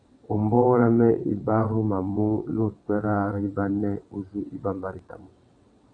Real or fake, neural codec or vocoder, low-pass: fake; vocoder, 22.05 kHz, 80 mel bands, WaveNeXt; 9.9 kHz